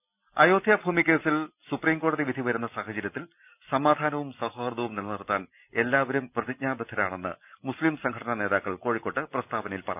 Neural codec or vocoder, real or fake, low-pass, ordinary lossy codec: none; real; 3.6 kHz; none